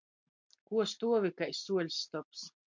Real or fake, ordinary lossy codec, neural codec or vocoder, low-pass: real; MP3, 64 kbps; none; 7.2 kHz